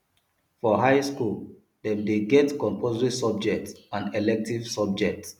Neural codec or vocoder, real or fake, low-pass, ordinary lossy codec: none; real; 19.8 kHz; none